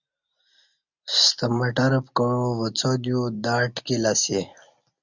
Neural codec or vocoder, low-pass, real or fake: none; 7.2 kHz; real